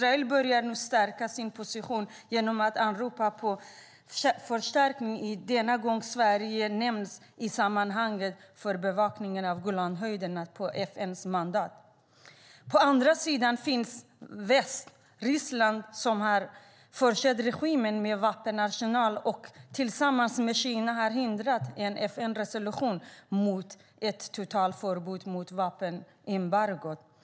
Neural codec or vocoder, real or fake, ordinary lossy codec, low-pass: none; real; none; none